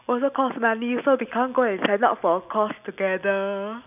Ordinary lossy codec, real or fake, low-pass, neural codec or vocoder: none; real; 3.6 kHz; none